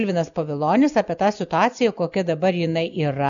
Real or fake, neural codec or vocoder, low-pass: real; none; 7.2 kHz